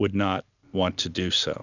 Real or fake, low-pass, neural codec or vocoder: real; 7.2 kHz; none